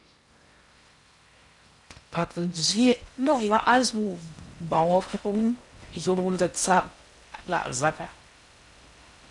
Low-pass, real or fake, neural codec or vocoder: 10.8 kHz; fake; codec, 16 kHz in and 24 kHz out, 0.6 kbps, FocalCodec, streaming, 4096 codes